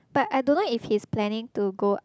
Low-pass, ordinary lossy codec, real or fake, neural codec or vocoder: none; none; real; none